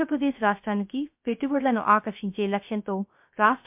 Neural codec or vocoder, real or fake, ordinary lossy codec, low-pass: codec, 16 kHz, 0.3 kbps, FocalCodec; fake; MP3, 32 kbps; 3.6 kHz